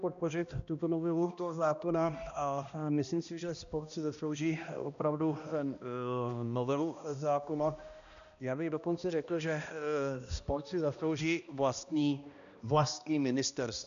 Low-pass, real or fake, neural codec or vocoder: 7.2 kHz; fake; codec, 16 kHz, 1 kbps, X-Codec, HuBERT features, trained on balanced general audio